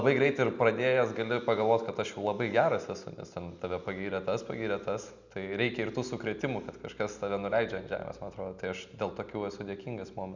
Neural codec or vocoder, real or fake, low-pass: none; real; 7.2 kHz